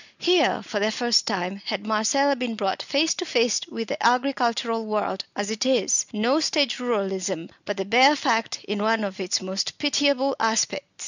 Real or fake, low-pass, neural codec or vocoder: real; 7.2 kHz; none